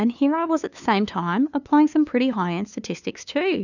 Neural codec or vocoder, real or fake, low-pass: codec, 16 kHz, 2 kbps, FunCodec, trained on LibriTTS, 25 frames a second; fake; 7.2 kHz